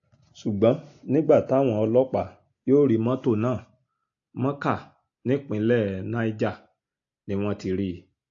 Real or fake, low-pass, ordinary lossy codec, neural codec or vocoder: real; 7.2 kHz; AAC, 48 kbps; none